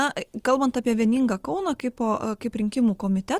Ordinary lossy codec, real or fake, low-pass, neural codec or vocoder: Opus, 24 kbps; real; 14.4 kHz; none